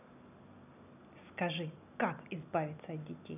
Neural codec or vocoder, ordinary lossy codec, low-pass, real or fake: none; none; 3.6 kHz; real